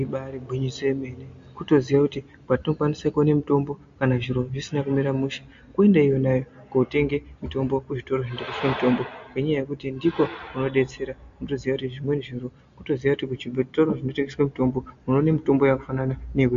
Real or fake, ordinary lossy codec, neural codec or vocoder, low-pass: real; MP3, 48 kbps; none; 7.2 kHz